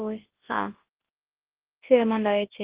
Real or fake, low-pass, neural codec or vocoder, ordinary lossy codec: fake; 3.6 kHz; codec, 24 kHz, 0.9 kbps, WavTokenizer, large speech release; Opus, 16 kbps